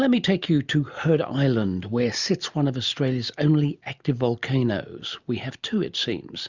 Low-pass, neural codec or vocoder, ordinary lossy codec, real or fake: 7.2 kHz; none; Opus, 64 kbps; real